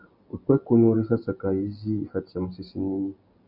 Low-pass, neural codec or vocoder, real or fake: 5.4 kHz; none; real